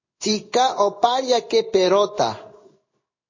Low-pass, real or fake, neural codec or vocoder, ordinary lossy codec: 7.2 kHz; fake; codec, 16 kHz in and 24 kHz out, 1 kbps, XY-Tokenizer; MP3, 32 kbps